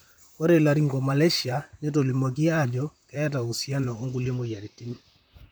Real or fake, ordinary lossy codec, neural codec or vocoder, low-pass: fake; none; vocoder, 44.1 kHz, 128 mel bands, Pupu-Vocoder; none